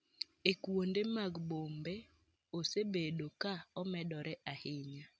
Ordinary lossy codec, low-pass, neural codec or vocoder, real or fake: none; none; none; real